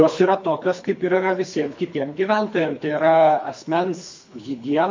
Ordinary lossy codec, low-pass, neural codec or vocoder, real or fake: MP3, 48 kbps; 7.2 kHz; codec, 24 kHz, 3 kbps, HILCodec; fake